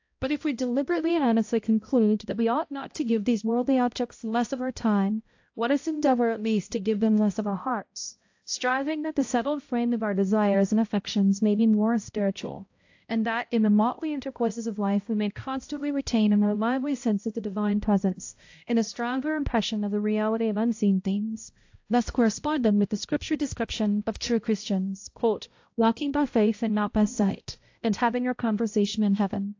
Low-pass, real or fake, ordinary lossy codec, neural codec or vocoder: 7.2 kHz; fake; AAC, 48 kbps; codec, 16 kHz, 0.5 kbps, X-Codec, HuBERT features, trained on balanced general audio